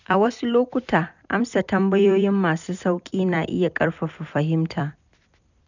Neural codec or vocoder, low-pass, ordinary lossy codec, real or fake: vocoder, 44.1 kHz, 128 mel bands every 256 samples, BigVGAN v2; 7.2 kHz; none; fake